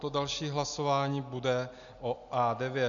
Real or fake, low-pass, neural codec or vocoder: real; 7.2 kHz; none